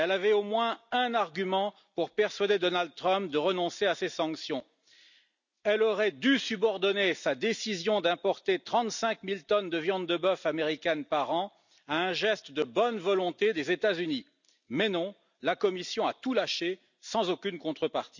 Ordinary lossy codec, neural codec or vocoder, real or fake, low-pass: none; none; real; 7.2 kHz